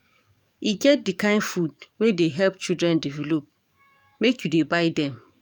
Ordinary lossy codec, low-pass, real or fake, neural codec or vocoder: none; 19.8 kHz; fake; codec, 44.1 kHz, 7.8 kbps, DAC